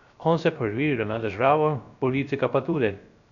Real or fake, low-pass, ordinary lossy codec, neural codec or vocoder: fake; 7.2 kHz; none; codec, 16 kHz, 0.3 kbps, FocalCodec